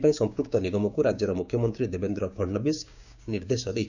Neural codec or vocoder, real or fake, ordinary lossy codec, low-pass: codec, 16 kHz, 6 kbps, DAC; fake; none; 7.2 kHz